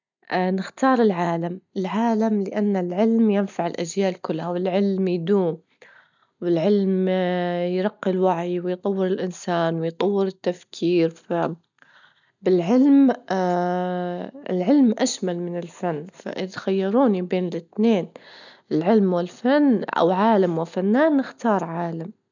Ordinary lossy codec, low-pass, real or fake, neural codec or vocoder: none; 7.2 kHz; real; none